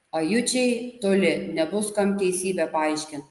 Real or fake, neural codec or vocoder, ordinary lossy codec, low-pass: real; none; Opus, 32 kbps; 10.8 kHz